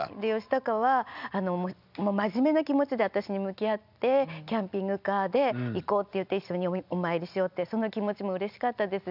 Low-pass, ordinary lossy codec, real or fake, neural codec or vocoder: 5.4 kHz; none; real; none